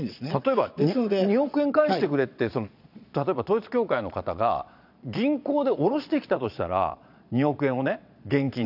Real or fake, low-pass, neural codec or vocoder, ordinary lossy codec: fake; 5.4 kHz; vocoder, 22.05 kHz, 80 mel bands, Vocos; none